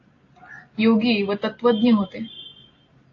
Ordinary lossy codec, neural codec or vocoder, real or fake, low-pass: AAC, 32 kbps; none; real; 7.2 kHz